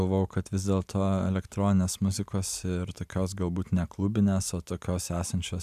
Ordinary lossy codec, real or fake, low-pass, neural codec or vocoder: AAC, 96 kbps; real; 14.4 kHz; none